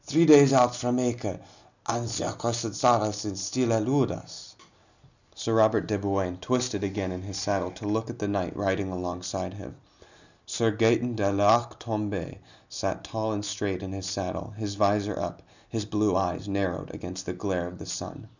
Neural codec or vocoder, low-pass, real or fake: none; 7.2 kHz; real